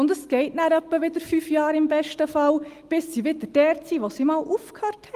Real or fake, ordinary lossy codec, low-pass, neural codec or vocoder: real; Opus, 24 kbps; 14.4 kHz; none